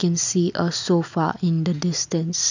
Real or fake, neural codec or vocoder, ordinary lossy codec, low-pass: real; none; none; 7.2 kHz